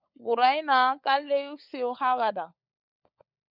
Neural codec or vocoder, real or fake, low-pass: codec, 16 kHz, 16 kbps, FunCodec, trained on LibriTTS, 50 frames a second; fake; 5.4 kHz